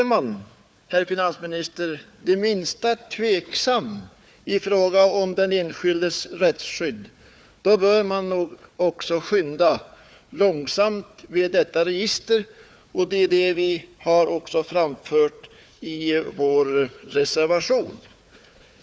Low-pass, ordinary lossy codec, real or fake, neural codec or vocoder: none; none; fake; codec, 16 kHz, 4 kbps, FunCodec, trained on Chinese and English, 50 frames a second